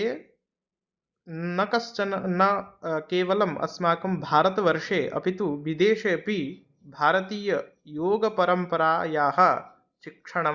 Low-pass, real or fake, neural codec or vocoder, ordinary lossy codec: 7.2 kHz; real; none; Opus, 64 kbps